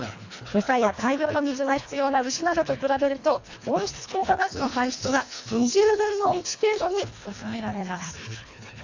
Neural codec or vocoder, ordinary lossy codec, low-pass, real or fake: codec, 24 kHz, 1.5 kbps, HILCodec; AAC, 48 kbps; 7.2 kHz; fake